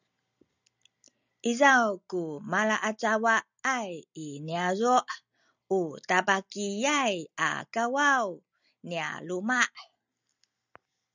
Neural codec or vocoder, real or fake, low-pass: none; real; 7.2 kHz